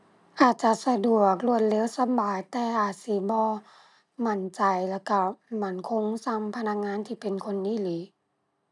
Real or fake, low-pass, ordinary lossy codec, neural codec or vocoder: real; 10.8 kHz; none; none